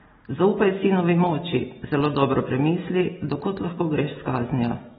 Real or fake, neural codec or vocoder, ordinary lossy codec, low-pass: real; none; AAC, 16 kbps; 7.2 kHz